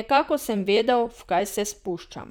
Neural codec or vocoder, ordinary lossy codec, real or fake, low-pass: vocoder, 44.1 kHz, 128 mel bands, Pupu-Vocoder; none; fake; none